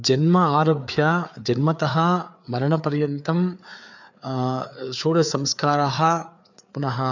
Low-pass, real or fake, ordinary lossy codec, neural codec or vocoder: 7.2 kHz; fake; none; codec, 16 kHz, 4 kbps, FreqCodec, larger model